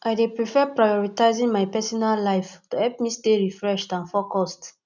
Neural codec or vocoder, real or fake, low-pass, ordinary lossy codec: none; real; 7.2 kHz; none